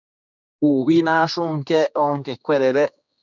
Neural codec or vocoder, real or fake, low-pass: codec, 16 kHz, 2 kbps, X-Codec, HuBERT features, trained on general audio; fake; 7.2 kHz